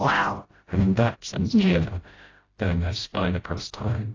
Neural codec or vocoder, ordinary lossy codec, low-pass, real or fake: codec, 16 kHz, 0.5 kbps, FreqCodec, smaller model; AAC, 32 kbps; 7.2 kHz; fake